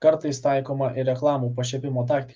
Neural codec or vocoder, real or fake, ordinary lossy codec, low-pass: none; real; Opus, 32 kbps; 7.2 kHz